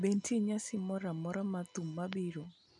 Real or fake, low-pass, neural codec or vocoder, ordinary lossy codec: real; 10.8 kHz; none; none